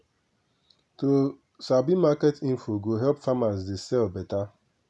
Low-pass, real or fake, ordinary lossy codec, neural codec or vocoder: none; real; none; none